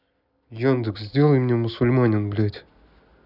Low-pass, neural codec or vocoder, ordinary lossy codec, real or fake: 5.4 kHz; none; none; real